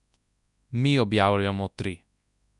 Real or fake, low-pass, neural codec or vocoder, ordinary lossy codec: fake; 10.8 kHz; codec, 24 kHz, 0.9 kbps, WavTokenizer, large speech release; none